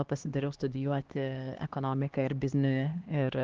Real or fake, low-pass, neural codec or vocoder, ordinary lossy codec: fake; 7.2 kHz; codec, 16 kHz, 2 kbps, X-Codec, HuBERT features, trained on LibriSpeech; Opus, 16 kbps